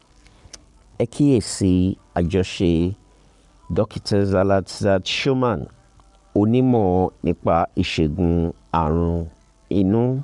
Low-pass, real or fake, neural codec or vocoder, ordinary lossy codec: 10.8 kHz; fake; codec, 44.1 kHz, 7.8 kbps, Pupu-Codec; none